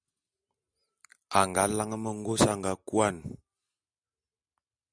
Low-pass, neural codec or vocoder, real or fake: 9.9 kHz; none; real